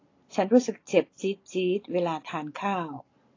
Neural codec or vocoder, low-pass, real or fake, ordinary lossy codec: none; 7.2 kHz; real; AAC, 32 kbps